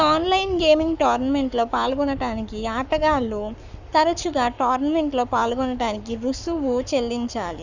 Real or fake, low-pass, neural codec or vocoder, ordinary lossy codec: fake; 7.2 kHz; codec, 44.1 kHz, 7.8 kbps, Pupu-Codec; Opus, 64 kbps